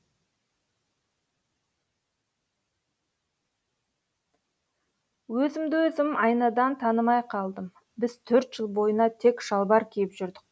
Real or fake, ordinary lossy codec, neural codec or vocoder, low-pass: real; none; none; none